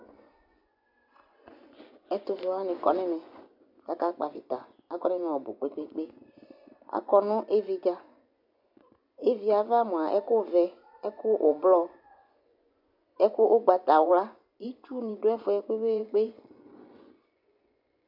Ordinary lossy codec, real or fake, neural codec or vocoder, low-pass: MP3, 48 kbps; fake; vocoder, 24 kHz, 100 mel bands, Vocos; 5.4 kHz